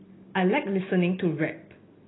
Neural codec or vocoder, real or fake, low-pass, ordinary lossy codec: none; real; 7.2 kHz; AAC, 16 kbps